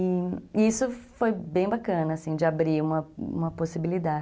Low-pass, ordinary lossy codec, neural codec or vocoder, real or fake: none; none; none; real